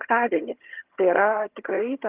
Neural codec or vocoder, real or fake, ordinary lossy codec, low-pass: vocoder, 22.05 kHz, 80 mel bands, HiFi-GAN; fake; Opus, 32 kbps; 3.6 kHz